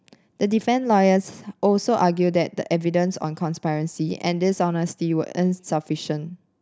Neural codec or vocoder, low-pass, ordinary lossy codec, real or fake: none; none; none; real